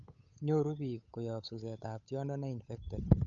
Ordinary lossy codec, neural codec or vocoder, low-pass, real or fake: none; codec, 16 kHz, 8 kbps, FunCodec, trained on Chinese and English, 25 frames a second; 7.2 kHz; fake